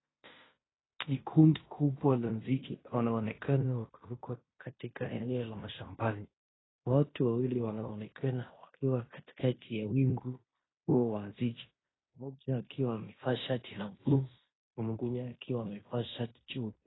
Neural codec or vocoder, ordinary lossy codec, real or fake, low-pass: codec, 16 kHz in and 24 kHz out, 0.9 kbps, LongCat-Audio-Codec, fine tuned four codebook decoder; AAC, 16 kbps; fake; 7.2 kHz